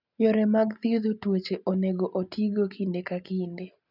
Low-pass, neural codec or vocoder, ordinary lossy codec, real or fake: 5.4 kHz; none; none; real